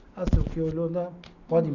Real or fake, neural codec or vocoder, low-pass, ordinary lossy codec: real; none; 7.2 kHz; none